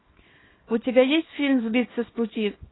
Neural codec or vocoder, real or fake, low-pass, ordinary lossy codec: codec, 24 kHz, 0.9 kbps, WavTokenizer, small release; fake; 7.2 kHz; AAC, 16 kbps